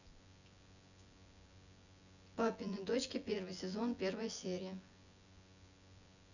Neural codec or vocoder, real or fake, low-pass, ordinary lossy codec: vocoder, 24 kHz, 100 mel bands, Vocos; fake; 7.2 kHz; none